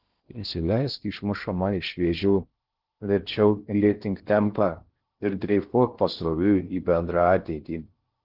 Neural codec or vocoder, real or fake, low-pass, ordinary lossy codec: codec, 16 kHz in and 24 kHz out, 0.6 kbps, FocalCodec, streaming, 2048 codes; fake; 5.4 kHz; Opus, 16 kbps